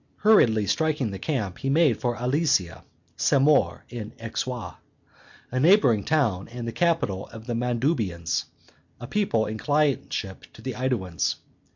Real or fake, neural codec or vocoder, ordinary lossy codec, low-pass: real; none; MP3, 48 kbps; 7.2 kHz